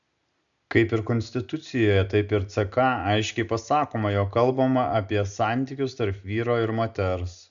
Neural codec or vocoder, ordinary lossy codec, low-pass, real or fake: none; MP3, 96 kbps; 7.2 kHz; real